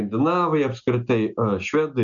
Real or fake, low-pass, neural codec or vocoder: real; 7.2 kHz; none